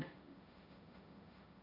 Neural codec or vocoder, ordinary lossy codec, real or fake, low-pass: codec, 16 kHz, 1.1 kbps, Voila-Tokenizer; none; fake; 5.4 kHz